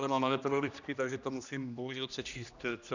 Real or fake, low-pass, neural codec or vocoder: fake; 7.2 kHz; codec, 16 kHz, 1 kbps, X-Codec, HuBERT features, trained on general audio